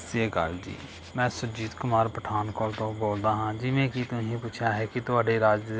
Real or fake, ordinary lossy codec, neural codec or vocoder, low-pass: real; none; none; none